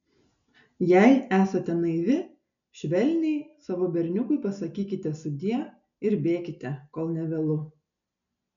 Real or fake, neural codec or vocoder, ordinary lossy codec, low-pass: real; none; MP3, 96 kbps; 7.2 kHz